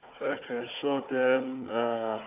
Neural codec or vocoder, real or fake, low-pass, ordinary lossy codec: codec, 16 kHz, 4 kbps, FunCodec, trained on Chinese and English, 50 frames a second; fake; 3.6 kHz; AAC, 32 kbps